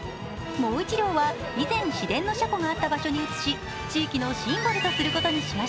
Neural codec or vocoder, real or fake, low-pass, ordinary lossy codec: none; real; none; none